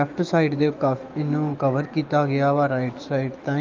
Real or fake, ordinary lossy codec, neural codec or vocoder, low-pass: fake; Opus, 32 kbps; autoencoder, 48 kHz, 128 numbers a frame, DAC-VAE, trained on Japanese speech; 7.2 kHz